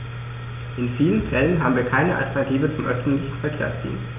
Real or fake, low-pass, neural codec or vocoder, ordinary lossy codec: real; 3.6 kHz; none; none